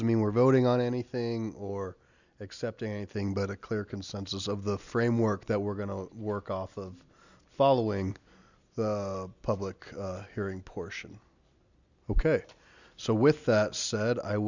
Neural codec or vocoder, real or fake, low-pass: none; real; 7.2 kHz